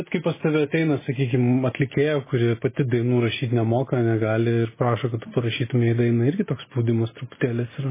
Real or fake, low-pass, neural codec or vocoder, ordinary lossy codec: real; 3.6 kHz; none; MP3, 16 kbps